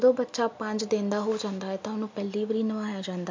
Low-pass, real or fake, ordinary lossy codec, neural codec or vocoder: 7.2 kHz; real; MP3, 64 kbps; none